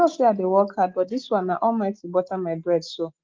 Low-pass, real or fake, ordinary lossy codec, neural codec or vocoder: 7.2 kHz; real; Opus, 32 kbps; none